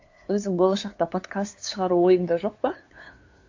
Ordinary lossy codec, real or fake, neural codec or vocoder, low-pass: AAC, 48 kbps; fake; codec, 16 kHz, 2 kbps, FunCodec, trained on LibriTTS, 25 frames a second; 7.2 kHz